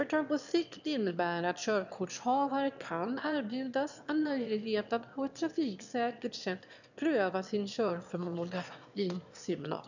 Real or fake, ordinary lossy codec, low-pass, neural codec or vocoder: fake; none; 7.2 kHz; autoencoder, 22.05 kHz, a latent of 192 numbers a frame, VITS, trained on one speaker